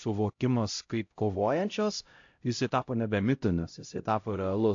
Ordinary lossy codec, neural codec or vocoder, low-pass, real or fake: AAC, 48 kbps; codec, 16 kHz, 0.5 kbps, X-Codec, HuBERT features, trained on LibriSpeech; 7.2 kHz; fake